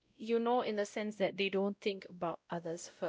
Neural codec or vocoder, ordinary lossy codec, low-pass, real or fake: codec, 16 kHz, 0.5 kbps, X-Codec, WavLM features, trained on Multilingual LibriSpeech; none; none; fake